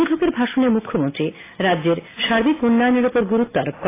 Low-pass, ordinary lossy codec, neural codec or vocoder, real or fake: 3.6 kHz; AAC, 16 kbps; none; real